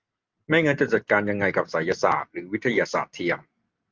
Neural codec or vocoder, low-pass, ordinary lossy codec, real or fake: none; 7.2 kHz; Opus, 32 kbps; real